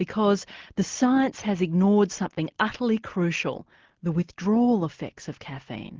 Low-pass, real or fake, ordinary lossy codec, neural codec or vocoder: 7.2 kHz; real; Opus, 16 kbps; none